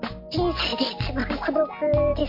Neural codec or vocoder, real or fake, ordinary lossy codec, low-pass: none; real; MP3, 32 kbps; 5.4 kHz